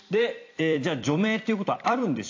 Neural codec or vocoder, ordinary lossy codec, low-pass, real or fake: vocoder, 44.1 kHz, 128 mel bands every 256 samples, BigVGAN v2; AAC, 48 kbps; 7.2 kHz; fake